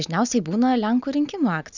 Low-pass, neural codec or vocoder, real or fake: 7.2 kHz; none; real